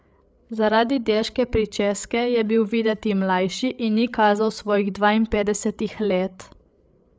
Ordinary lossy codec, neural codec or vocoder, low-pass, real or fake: none; codec, 16 kHz, 8 kbps, FreqCodec, larger model; none; fake